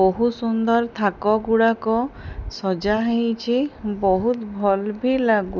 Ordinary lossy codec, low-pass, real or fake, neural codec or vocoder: none; 7.2 kHz; real; none